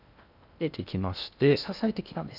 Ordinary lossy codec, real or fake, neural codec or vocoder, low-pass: none; fake; codec, 16 kHz in and 24 kHz out, 0.6 kbps, FocalCodec, streaming, 2048 codes; 5.4 kHz